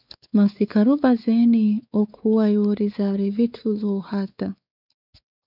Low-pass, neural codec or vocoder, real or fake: 5.4 kHz; codec, 16 kHz, 4 kbps, X-Codec, WavLM features, trained on Multilingual LibriSpeech; fake